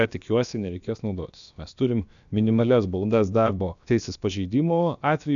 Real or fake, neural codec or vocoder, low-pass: fake; codec, 16 kHz, about 1 kbps, DyCAST, with the encoder's durations; 7.2 kHz